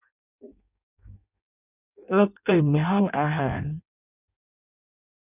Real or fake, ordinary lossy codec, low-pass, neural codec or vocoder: fake; none; 3.6 kHz; codec, 16 kHz in and 24 kHz out, 0.6 kbps, FireRedTTS-2 codec